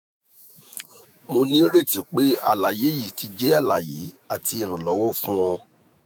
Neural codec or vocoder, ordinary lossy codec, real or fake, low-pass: autoencoder, 48 kHz, 128 numbers a frame, DAC-VAE, trained on Japanese speech; none; fake; none